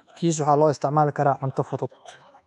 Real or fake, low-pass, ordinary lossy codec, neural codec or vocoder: fake; 10.8 kHz; none; codec, 24 kHz, 1.2 kbps, DualCodec